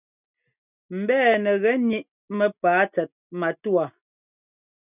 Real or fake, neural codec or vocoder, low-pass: real; none; 3.6 kHz